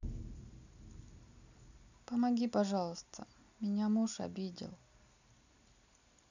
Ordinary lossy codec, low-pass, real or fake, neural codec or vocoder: none; 7.2 kHz; real; none